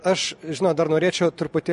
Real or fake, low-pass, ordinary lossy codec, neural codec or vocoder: real; 14.4 kHz; MP3, 48 kbps; none